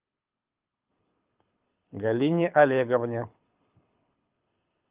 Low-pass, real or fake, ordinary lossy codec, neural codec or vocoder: 3.6 kHz; fake; Opus, 24 kbps; codec, 24 kHz, 6 kbps, HILCodec